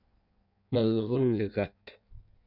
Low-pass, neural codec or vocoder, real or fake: 5.4 kHz; codec, 16 kHz in and 24 kHz out, 1.1 kbps, FireRedTTS-2 codec; fake